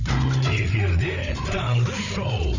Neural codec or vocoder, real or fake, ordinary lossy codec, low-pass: codec, 16 kHz, 8 kbps, FreqCodec, larger model; fake; none; 7.2 kHz